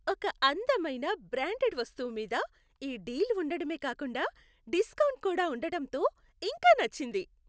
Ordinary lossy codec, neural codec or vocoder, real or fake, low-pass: none; none; real; none